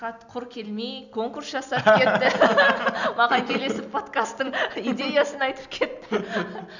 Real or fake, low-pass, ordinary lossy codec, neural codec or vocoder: real; 7.2 kHz; none; none